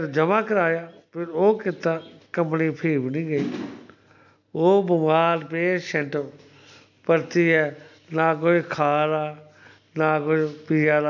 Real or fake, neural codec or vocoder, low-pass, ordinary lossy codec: real; none; 7.2 kHz; none